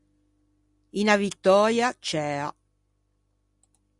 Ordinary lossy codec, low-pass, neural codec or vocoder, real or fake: Opus, 64 kbps; 10.8 kHz; none; real